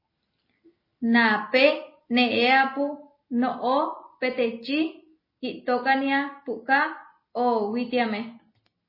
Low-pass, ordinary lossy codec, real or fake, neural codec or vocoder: 5.4 kHz; MP3, 24 kbps; real; none